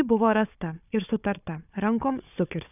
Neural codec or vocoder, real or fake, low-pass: codec, 16 kHz, 4 kbps, FunCodec, trained on Chinese and English, 50 frames a second; fake; 3.6 kHz